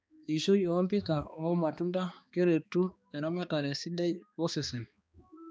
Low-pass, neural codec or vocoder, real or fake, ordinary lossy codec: none; codec, 16 kHz, 2 kbps, X-Codec, HuBERT features, trained on balanced general audio; fake; none